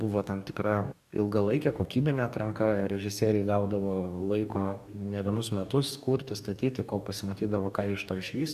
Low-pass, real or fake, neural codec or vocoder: 14.4 kHz; fake; codec, 44.1 kHz, 2.6 kbps, DAC